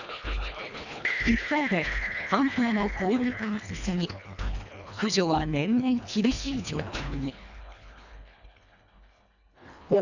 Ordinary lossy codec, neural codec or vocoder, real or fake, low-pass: none; codec, 24 kHz, 1.5 kbps, HILCodec; fake; 7.2 kHz